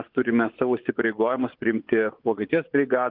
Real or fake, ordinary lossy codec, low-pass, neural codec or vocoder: fake; Opus, 24 kbps; 5.4 kHz; vocoder, 24 kHz, 100 mel bands, Vocos